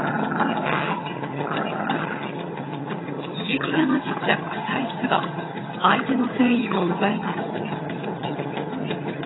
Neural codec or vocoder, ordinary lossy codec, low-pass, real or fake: vocoder, 22.05 kHz, 80 mel bands, HiFi-GAN; AAC, 16 kbps; 7.2 kHz; fake